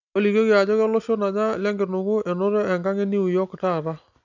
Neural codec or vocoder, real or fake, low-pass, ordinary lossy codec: none; real; 7.2 kHz; none